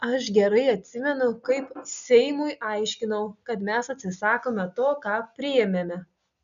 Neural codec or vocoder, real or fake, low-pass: none; real; 7.2 kHz